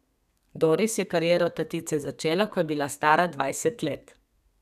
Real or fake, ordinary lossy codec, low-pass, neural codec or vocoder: fake; none; 14.4 kHz; codec, 32 kHz, 1.9 kbps, SNAC